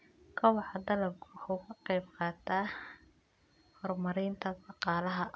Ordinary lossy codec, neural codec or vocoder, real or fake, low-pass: none; none; real; none